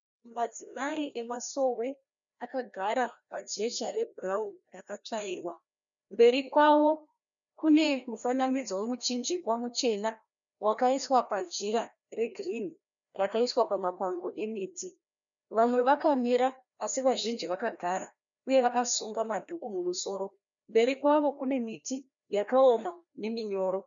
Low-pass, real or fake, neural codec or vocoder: 7.2 kHz; fake; codec, 16 kHz, 1 kbps, FreqCodec, larger model